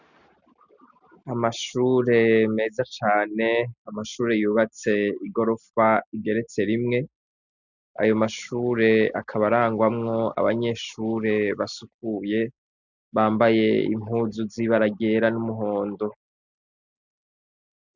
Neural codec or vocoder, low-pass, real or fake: none; 7.2 kHz; real